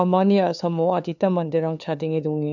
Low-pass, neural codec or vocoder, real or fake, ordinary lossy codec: 7.2 kHz; codec, 16 kHz, 2 kbps, FunCodec, trained on LibriTTS, 25 frames a second; fake; none